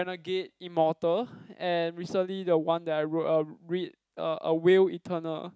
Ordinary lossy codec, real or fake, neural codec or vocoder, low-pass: none; real; none; none